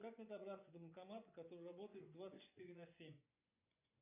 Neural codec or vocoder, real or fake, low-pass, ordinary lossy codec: vocoder, 24 kHz, 100 mel bands, Vocos; fake; 3.6 kHz; MP3, 32 kbps